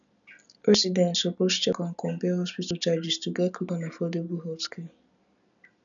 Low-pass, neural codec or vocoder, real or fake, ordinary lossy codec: 7.2 kHz; none; real; none